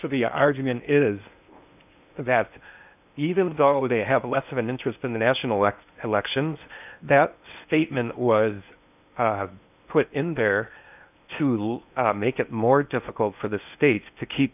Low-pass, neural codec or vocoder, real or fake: 3.6 kHz; codec, 16 kHz in and 24 kHz out, 0.8 kbps, FocalCodec, streaming, 65536 codes; fake